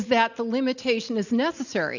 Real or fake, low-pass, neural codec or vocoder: real; 7.2 kHz; none